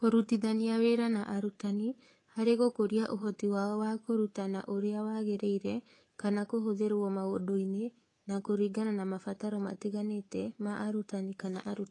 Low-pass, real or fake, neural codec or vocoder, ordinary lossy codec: 10.8 kHz; fake; codec, 24 kHz, 3.1 kbps, DualCodec; AAC, 32 kbps